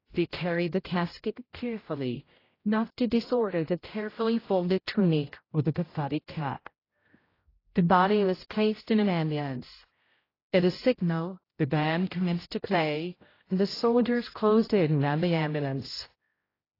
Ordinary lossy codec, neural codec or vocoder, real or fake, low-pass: AAC, 24 kbps; codec, 16 kHz, 0.5 kbps, X-Codec, HuBERT features, trained on general audio; fake; 5.4 kHz